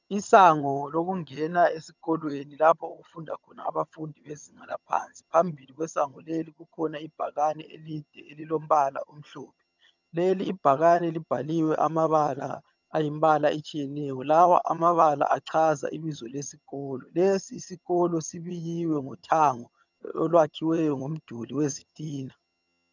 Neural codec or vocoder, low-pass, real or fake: vocoder, 22.05 kHz, 80 mel bands, HiFi-GAN; 7.2 kHz; fake